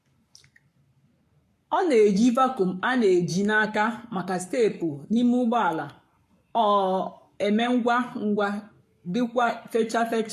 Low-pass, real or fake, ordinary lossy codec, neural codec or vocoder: 14.4 kHz; fake; MP3, 64 kbps; codec, 44.1 kHz, 7.8 kbps, Pupu-Codec